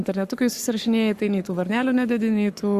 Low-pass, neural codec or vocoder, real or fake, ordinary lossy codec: 14.4 kHz; codec, 44.1 kHz, 7.8 kbps, Pupu-Codec; fake; Opus, 64 kbps